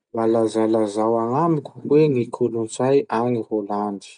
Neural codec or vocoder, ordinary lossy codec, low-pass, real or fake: none; none; 9.9 kHz; real